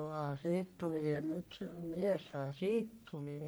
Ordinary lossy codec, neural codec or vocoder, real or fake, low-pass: none; codec, 44.1 kHz, 1.7 kbps, Pupu-Codec; fake; none